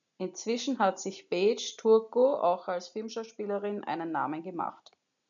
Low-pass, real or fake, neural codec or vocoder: 7.2 kHz; real; none